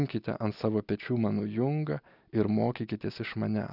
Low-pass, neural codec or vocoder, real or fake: 5.4 kHz; none; real